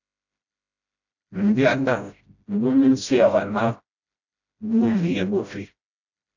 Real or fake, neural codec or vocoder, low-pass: fake; codec, 16 kHz, 0.5 kbps, FreqCodec, smaller model; 7.2 kHz